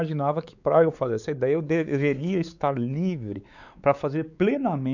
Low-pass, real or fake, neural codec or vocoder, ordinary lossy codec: 7.2 kHz; fake; codec, 16 kHz, 4 kbps, X-Codec, WavLM features, trained on Multilingual LibriSpeech; none